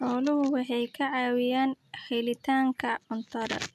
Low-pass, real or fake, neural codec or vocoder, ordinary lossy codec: 14.4 kHz; real; none; none